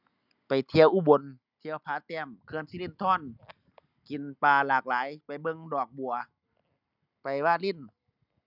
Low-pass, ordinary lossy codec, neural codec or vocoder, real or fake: 5.4 kHz; none; none; real